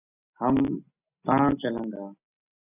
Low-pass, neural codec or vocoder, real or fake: 3.6 kHz; none; real